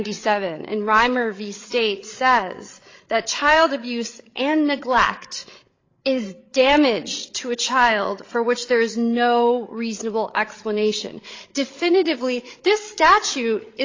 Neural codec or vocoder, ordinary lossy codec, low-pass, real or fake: codec, 16 kHz, 8 kbps, FreqCodec, larger model; AAC, 32 kbps; 7.2 kHz; fake